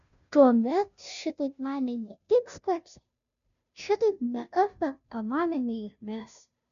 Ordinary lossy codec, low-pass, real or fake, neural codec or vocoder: MP3, 96 kbps; 7.2 kHz; fake; codec, 16 kHz, 0.5 kbps, FunCodec, trained on Chinese and English, 25 frames a second